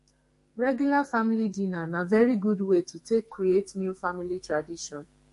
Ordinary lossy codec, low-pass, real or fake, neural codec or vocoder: MP3, 48 kbps; 14.4 kHz; fake; codec, 44.1 kHz, 2.6 kbps, SNAC